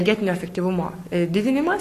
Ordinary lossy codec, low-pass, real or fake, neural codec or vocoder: AAC, 64 kbps; 14.4 kHz; fake; codec, 44.1 kHz, 7.8 kbps, Pupu-Codec